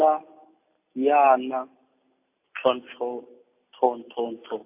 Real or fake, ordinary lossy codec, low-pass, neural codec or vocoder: real; AAC, 32 kbps; 3.6 kHz; none